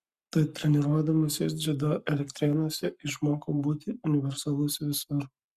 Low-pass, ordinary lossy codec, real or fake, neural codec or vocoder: 14.4 kHz; Opus, 64 kbps; fake; codec, 44.1 kHz, 7.8 kbps, Pupu-Codec